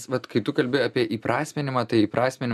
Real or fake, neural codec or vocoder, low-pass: real; none; 14.4 kHz